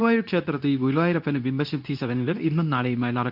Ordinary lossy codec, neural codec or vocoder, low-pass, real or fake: none; codec, 24 kHz, 0.9 kbps, WavTokenizer, medium speech release version 2; 5.4 kHz; fake